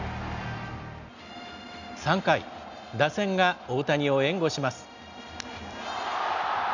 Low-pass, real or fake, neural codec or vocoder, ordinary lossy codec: 7.2 kHz; real; none; none